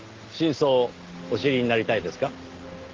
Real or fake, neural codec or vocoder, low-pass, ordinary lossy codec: real; none; 7.2 kHz; Opus, 16 kbps